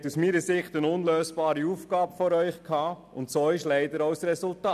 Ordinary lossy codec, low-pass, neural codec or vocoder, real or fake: none; 14.4 kHz; none; real